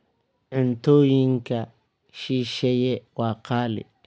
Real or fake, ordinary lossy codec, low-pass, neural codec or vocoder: real; none; none; none